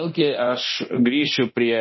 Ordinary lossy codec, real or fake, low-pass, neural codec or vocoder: MP3, 24 kbps; fake; 7.2 kHz; codec, 24 kHz, 0.9 kbps, DualCodec